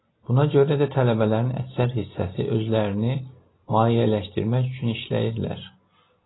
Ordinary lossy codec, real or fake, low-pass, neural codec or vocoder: AAC, 16 kbps; real; 7.2 kHz; none